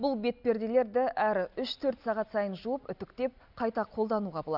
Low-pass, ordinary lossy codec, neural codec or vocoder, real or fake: 5.4 kHz; none; none; real